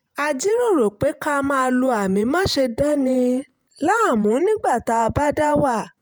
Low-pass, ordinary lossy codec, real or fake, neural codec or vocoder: none; none; fake; vocoder, 48 kHz, 128 mel bands, Vocos